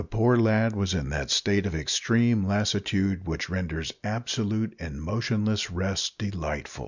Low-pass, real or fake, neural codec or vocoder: 7.2 kHz; real; none